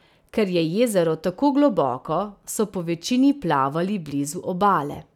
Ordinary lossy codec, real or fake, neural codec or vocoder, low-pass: none; real; none; 19.8 kHz